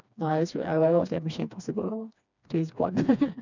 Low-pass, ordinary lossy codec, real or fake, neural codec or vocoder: 7.2 kHz; none; fake; codec, 16 kHz, 2 kbps, FreqCodec, smaller model